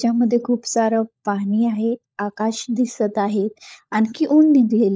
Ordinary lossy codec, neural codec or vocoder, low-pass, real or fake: none; codec, 16 kHz, 16 kbps, FunCodec, trained on LibriTTS, 50 frames a second; none; fake